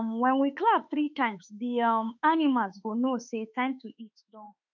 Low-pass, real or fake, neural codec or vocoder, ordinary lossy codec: 7.2 kHz; fake; autoencoder, 48 kHz, 32 numbers a frame, DAC-VAE, trained on Japanese speech; none